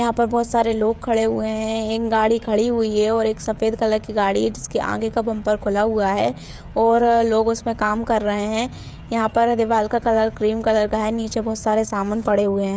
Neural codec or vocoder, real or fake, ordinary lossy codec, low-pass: codec, 16 kHz, 16 kbps, FreqCodec, smaller model; fake; none; none